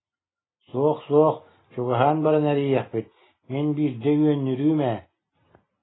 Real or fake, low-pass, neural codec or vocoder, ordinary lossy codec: real; 7.2 kHz; none; AAC, 16 kbps